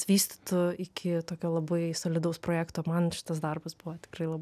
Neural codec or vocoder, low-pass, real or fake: none; 14.4 kHz; real